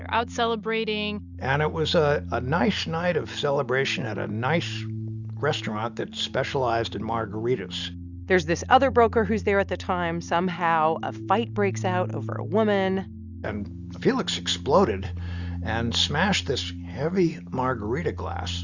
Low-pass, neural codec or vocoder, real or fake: 7.2 kHz; none; real